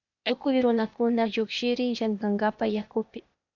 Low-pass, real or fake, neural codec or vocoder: 7.2 kHz; fake; codec, 16 kHz, 0.8 kbps, ZipCodec